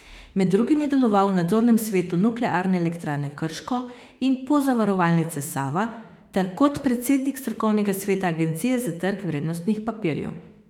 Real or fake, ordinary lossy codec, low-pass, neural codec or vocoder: fake; none; 19.8 kHz; autoencoder, 48 kHz, 32 numbers a frame, DAC-VAE, trained on Japanese speech